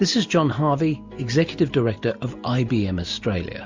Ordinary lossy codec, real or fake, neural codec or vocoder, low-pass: MP3, 64 kbps; real; none; 7.2 kHz